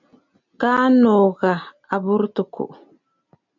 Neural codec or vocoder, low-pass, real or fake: none; 7.2 kHz; real